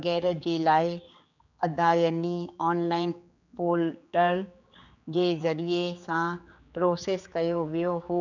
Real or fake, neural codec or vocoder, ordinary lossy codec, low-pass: fake; codec, 16 kHz, 4 kbps, X-Codec, HuBERT features, trained on general audio; none; 7.2 kHz